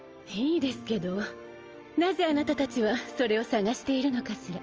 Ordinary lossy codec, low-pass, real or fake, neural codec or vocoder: Opus, 24 kbps; 7.2 kHz; real; none